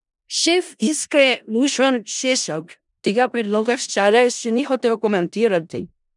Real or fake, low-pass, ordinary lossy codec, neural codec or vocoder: fake; 10.8 kHz; none; codec, 16 kHz in and 24 kHz out, 0.4 kbps, LongCat-Audio-Codec, four codebook decoder